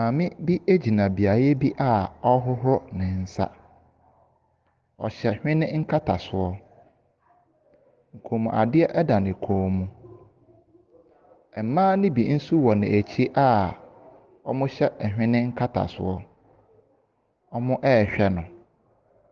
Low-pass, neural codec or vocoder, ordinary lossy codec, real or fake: 7.2 kHz; none; Opus, 16 kbps; real